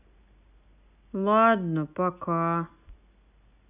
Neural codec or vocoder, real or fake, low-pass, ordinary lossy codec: none; real; 3.6 kHz; none